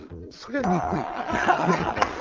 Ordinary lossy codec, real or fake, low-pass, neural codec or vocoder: Opus, 32 kbps; fake; 7.2 kHz; vocoder, 22.05 kHz, 80 mel bands, Vocos